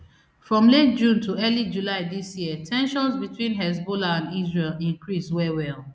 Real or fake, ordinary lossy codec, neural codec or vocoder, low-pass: real; none; none; none